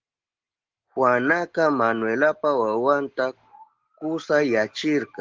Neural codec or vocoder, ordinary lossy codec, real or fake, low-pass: none; Opus, 16 kbps; real; 7.2 kHz